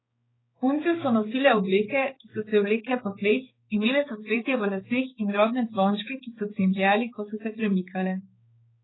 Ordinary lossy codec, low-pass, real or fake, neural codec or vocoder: AAC, 16 kbps; 7.2 kHz; fake; codec, 16 kHz, 4 kbps, X-Codec, HuBERT features, trained on balanced general audio